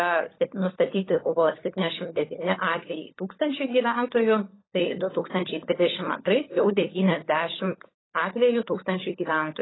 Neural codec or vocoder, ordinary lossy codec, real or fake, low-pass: codec, 16 kHz, 16 kbps, FunCodec, trained on LibriTTS, 50 frames a second; AAC, 16 kbps; fake; 7.2 kHz